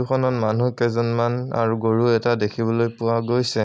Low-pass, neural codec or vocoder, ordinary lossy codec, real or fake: none; none; none; real